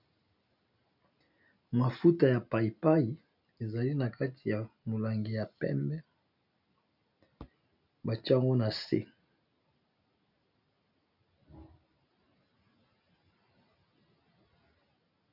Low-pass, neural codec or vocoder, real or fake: 5.4 kHz; none; real